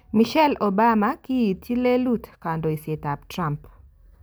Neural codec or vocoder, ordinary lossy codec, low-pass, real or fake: none; none; none; real